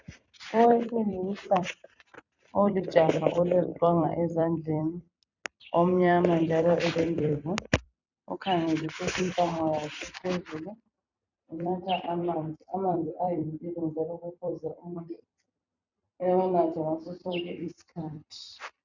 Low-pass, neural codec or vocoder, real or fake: 7.2 kHz; vocoder, 44.1 kHz, 128 mel bands every 256 samples, BigVGAN v2; fake